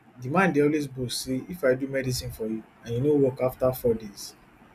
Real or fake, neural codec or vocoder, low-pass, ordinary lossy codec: real; none; 14.4 kHz; none